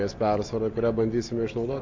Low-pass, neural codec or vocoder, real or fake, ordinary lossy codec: 7.2 kHz; none; real; MP3, 48 kbps